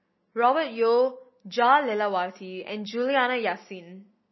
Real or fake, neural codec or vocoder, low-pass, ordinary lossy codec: real; none; 7.2 kHz; MP3, 24 kbps